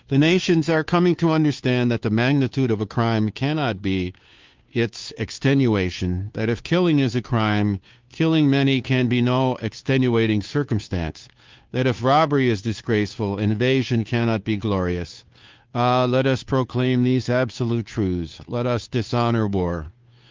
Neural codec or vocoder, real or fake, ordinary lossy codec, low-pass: codec, 16 kHz, 2 kbps, FunCodec, trained on Chinese and English, 25 frames a second; fake; Opus, 32 kbps; 7.2 kHz